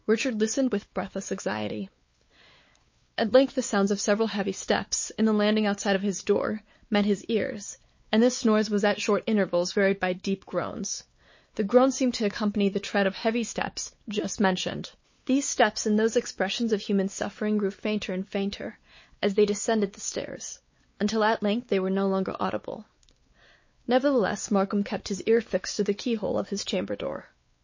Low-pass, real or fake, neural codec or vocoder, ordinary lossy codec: 7.2 kHz; fake; codec, 16 kHz, 4 kbps, X-Codec, WavLM features, trained on Multilingual LibriSpeech; MP3, 32 kbps